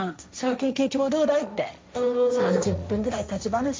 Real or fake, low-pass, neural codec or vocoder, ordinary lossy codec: fake; none; codec, 16 kHz, 1.1 kbps, Voila-Tokenizer; none